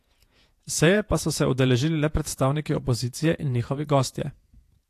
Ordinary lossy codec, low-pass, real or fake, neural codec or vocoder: AAC, 64 kbps; 14.4 kHz; fake; vocoder, 48 kHz, 128 mel bands, Vocos